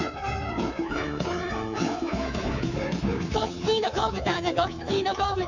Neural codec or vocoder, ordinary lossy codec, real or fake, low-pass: codec, 24 kHz, 3.1 kbps, DualCodec; none; fake; 7.2 kHz